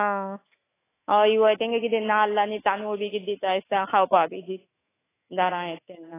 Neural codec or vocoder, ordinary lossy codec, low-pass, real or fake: autoencoder, 48 kHz, 128 numbers a frame, DAC-VAE, trained on Japanese speech; AAC, 16 kbps; 3.6 kHz; fake